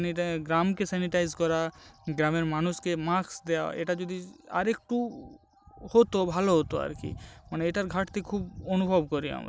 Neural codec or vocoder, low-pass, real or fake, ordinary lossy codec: none; none; real; none